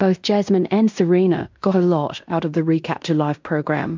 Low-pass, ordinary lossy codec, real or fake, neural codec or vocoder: 7.2 kHz; MP3, 64 kbps; fake; codec, 16 kHz in and 24 kHz out, 0.9 kbps, LongCat-Audio-Codec, fine tuned four codebook decoder